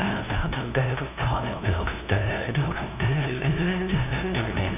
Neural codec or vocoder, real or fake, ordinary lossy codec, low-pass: codec, 16 kHz, 0.5 kbps, FunCodec, trained on LibriTTS, 25 frames a second; fake; none; 3.6 kHz